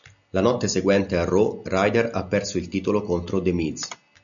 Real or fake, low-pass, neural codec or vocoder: real; 7.2 kHz; none